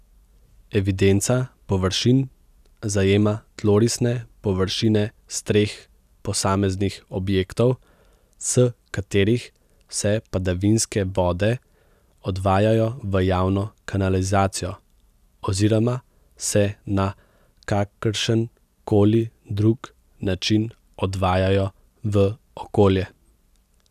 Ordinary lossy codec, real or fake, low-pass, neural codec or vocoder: none; real; 14.4 kHz; none